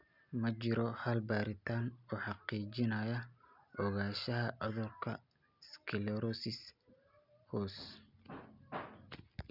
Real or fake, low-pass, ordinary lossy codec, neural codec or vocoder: real; 5.4 kHz; none; none